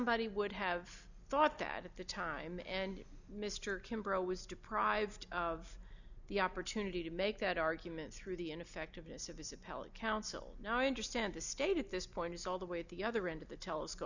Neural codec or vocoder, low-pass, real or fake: none; 7.2 kHz; real